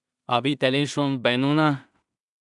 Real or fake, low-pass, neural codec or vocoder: fake; 10.8 kHz; codec, 16 kHz in and 24 kHz out, 0.4 kbps, LongCat-Audio-Codec, two codebook decoder